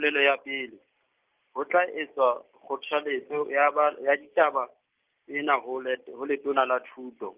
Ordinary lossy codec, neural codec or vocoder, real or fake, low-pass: Opus, 32 kbps; none; real; 3.6 kHz